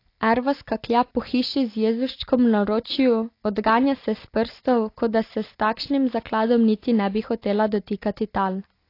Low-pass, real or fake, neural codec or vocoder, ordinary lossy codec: 5.4 kHz; real; none; AAC, 32 kbps